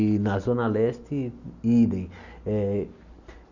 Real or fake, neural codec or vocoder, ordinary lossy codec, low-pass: real; none; none; 7.2 kHz